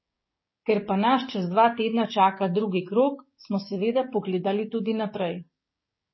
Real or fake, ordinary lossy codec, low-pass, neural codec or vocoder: fake; MP3, 24 kbps; 7.2 kHz; codec, 16 kHz, 6 kbps, DAC